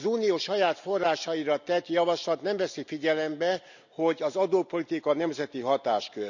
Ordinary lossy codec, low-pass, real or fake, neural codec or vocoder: none; 7.2 kHz; real; none